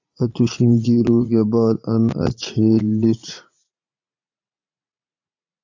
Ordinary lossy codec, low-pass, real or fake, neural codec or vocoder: AAC, 48 kbps; 7.2 kHz; fake; vocoder, 44.1 kHz, 80 mel bands, Vocos